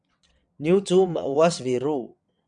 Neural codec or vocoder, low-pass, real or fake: vocoder, 22.05 kHz, 80 mel bands, WaveNeXt; 9.9 kHz; fake